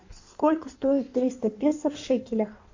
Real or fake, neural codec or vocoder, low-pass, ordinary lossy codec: fake; codec, 16 kHz in and 24 kHz out, 1.1 kbps, FireRedTTS-2 codec; 7.2 kHz; AAC, 48 kbps